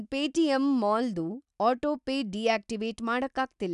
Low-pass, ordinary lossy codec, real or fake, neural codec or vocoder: 10.8 kHz; none; real; none